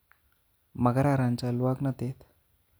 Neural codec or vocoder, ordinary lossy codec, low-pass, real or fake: none; none; none; real